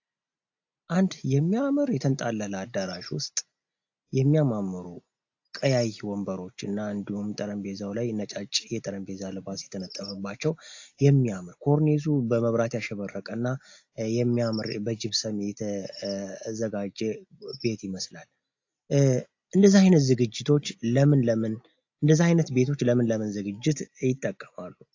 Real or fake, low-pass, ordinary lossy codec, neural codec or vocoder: real; 7.2 kHz; AAC, 48 kbps; none